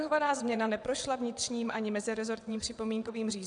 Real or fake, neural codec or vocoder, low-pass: fake; vocoder, 22.05 kHz, 80 mel bands, WaveNeXt; 9.9 kHz